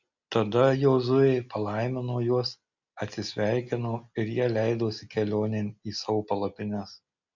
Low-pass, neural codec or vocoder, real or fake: 7.2 kHz; none; real